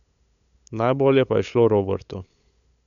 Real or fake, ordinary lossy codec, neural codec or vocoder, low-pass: fake; none; codec, 16 kHz, 8 kbps, FunCodec, trained on LibriTTS, 25 frames a second; 7.2 kHz